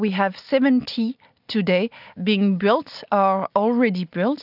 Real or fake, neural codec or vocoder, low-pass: real; none; 5.4 kHz